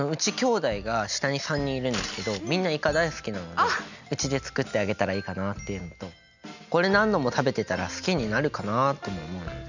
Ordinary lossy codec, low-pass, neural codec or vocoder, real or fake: none; 7.2 kHz; none; real